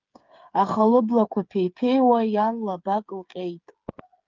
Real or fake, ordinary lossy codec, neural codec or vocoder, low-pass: fake; Opus, 32 kbps; codec, 16 kHz, 8 kbps, FreqCodec, smaller model; 7.2 kHz